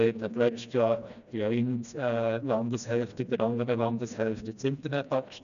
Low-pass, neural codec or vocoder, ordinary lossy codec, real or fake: 7.2 kHz; codec, 16 kHz, 1 kbps, FreqCodec, smaller model; none; fake